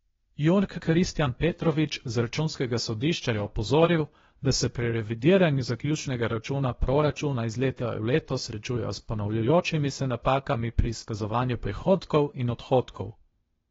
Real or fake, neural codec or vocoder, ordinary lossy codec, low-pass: fake; codec, 16 kHz, 0.8 kbps, ZipCodec; AAC, 24 kbps; 7.2 kHz